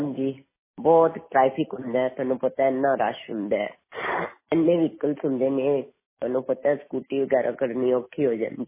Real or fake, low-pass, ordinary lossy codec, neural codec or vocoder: real; 3.6 kHz; MP3, 16 kbps; none